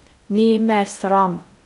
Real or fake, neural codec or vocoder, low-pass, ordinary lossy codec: fake; codec, 16 kHz in and 24 kHz out, 0.6 kbps, FocalCodec, streaming, 2048 codes; 10.8 kHz; none